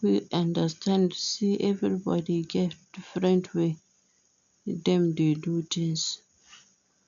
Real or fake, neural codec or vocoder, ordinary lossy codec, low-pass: real; none; none; 7.2 kHz